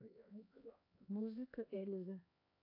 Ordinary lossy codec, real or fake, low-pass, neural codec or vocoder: AAC, 24 kbps; fake; 5.4 kHz; codec, 16 kHz, 2 kbps, X-Codec, HuBERT features, trained on LibriSpeech